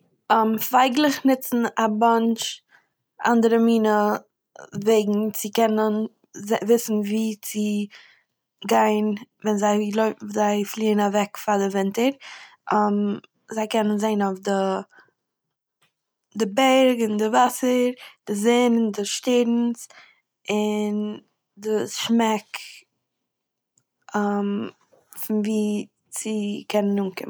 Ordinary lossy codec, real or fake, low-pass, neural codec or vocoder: none; real; none; none